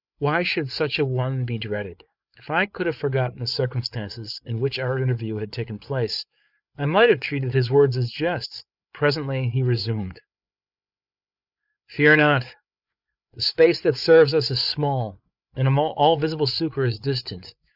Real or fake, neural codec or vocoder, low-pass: fake; codec, 16 kHz, 8 kbps, FreqCodec, larger model; 5.4 kHz